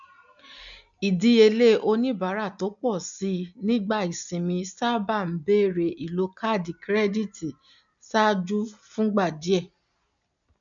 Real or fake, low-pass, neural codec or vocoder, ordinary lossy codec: real; 7.2 kHz; none; none